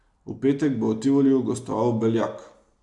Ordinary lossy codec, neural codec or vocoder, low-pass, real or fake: AAC, 64 kbps; none; 10.8 kHz; real